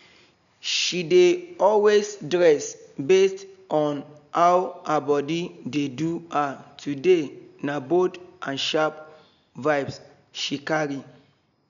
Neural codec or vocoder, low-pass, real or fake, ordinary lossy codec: none; 7.2 kHz; real; none